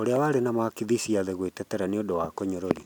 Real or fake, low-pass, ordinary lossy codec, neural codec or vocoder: real; 19.8 kHz; none; none